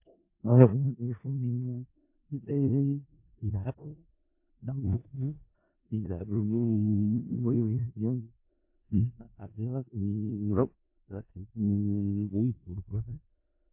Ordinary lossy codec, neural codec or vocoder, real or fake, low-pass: MP3, 24 kbps; codec, 16 kHz in and 24 kHz out, 0.4 kbps, LongCat-Audio-Codec, four codebook decoder; fake; 3.6 kHz